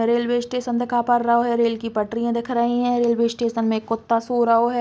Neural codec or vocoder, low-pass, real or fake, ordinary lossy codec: none; none; real; none